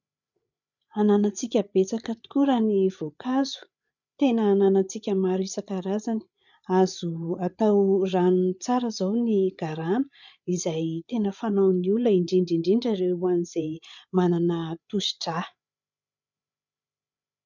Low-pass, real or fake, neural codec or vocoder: 7.2 kHz; fake; codec, 16 kHz, 8 kbps, FreqCodec, larger model